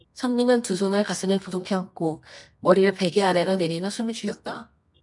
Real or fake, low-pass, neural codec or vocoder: fake; 10.8 kHz; codec, 24 kHz, 0.9 kbps, WavTokenizer, medium music audio release